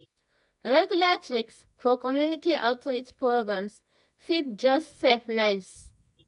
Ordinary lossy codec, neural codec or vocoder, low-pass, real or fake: none; codec, 24 kHz, 0.9 kbps, WavTokenizer, medium music audio release; 10.8 kHz; fake